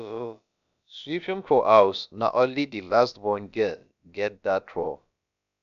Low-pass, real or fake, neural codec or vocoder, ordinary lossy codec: 7.2 kHz; fake; codec, 16 kHz, about 1 kbps, DyCAST, with the encoder's durations; none